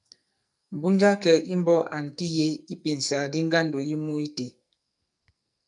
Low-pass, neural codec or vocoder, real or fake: 10.8 kHz; codec, 44.1 kHz, 2.6 kbps, SNAC; fake